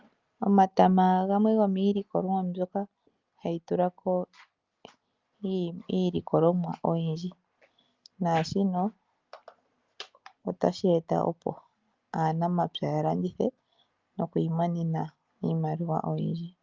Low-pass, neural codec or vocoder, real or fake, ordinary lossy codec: 7.2 kHz; none; real; Opus, 24 kbps